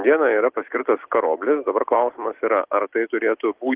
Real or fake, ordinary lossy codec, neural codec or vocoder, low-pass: real; Opus, 16 kbps; none; 3.6 kHz